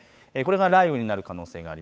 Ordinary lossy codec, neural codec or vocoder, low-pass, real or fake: none; codec, 16 kHz, 8 kbps, FunCodec, trained on Chinese and English, 25 frames a second; none; fake